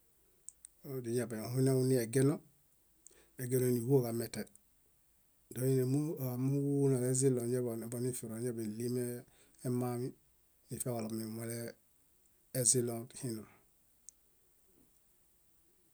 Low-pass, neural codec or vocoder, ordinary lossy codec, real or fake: none; none; none; real